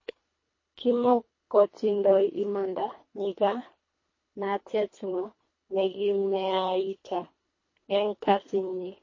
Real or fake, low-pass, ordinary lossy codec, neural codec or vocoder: fake; 7.2 kHz; MP3, 32 kbps; codec, 24 kHz, 1.5 kbps, HILCodec